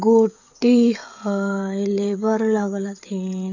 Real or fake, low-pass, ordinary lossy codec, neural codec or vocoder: fake; 7.2 kHz; AAC, 48 kbps; codec, 16 kHz, 16 kbps, FreqCodec, smaller model